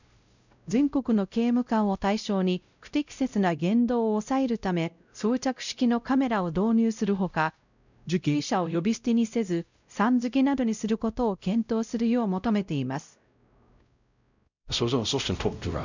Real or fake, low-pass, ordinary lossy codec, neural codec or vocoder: fake; 7.2 kHz; none; codec, 16 kHz, 0.5 kbps, X-Codec, WavLM features, trained on Multilingual LibriSpeech